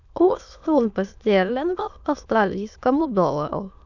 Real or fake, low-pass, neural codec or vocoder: fake; 7.2 kHz; autoencoder, 22.05 kHz, a latent of 192 numbers a frame, VITS, trained on many speakers